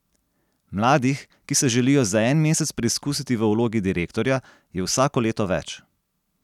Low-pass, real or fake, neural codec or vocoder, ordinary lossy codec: 19.8 kHz; real; none; none